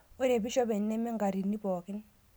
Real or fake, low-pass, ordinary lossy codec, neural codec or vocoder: real; none; none; none